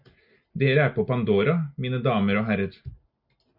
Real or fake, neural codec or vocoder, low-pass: real; none; 5.4 kHz